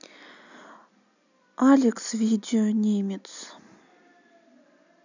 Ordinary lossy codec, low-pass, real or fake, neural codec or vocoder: none; 7.2 kHz; real; none